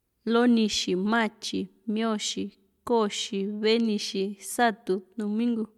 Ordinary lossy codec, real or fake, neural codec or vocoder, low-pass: MP3, 96 kbps; real; none; 19.8 kHz